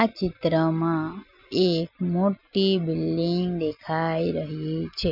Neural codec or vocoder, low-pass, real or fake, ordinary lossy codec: none; 5.4 kHz; real; none